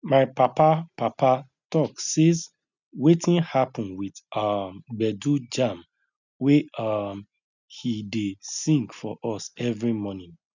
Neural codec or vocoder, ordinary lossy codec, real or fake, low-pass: none; none; real; 7.2 kHz